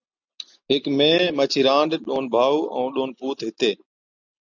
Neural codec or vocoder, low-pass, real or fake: none; 7.2 kHz; real